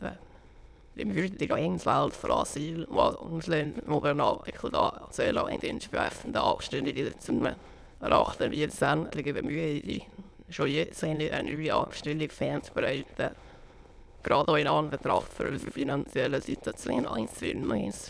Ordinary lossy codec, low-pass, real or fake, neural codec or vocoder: none; none; fake; autoencoder, 22.05 kHz, a latent of 192 numbers a frame, VITS, trained on many speakers